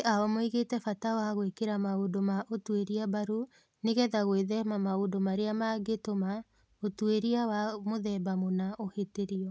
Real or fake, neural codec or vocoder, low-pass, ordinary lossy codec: real; none; none; none